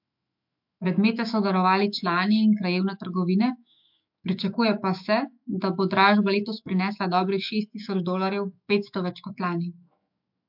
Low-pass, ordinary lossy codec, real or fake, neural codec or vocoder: 5.4 kHz; MP3, 48 kbps; fake; autoencoder, 48 kHz, 128 numbers a frame, DAC-VAE, trained on Japanese speech